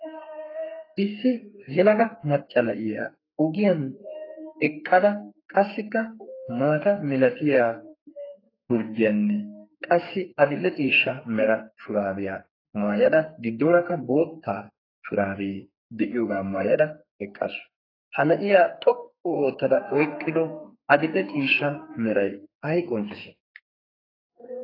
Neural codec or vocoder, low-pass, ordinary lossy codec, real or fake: codec, 32 kHz, 1.9 kbps, SNAC; 5.4 kHz; AAC, 24 kbps; fake